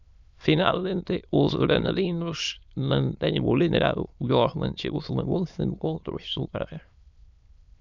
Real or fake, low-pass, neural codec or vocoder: fake; 7.2 kHz; autoencoder, 22.05 kHz, a latent of 192 numbers a frame, VITS, trained on many speakers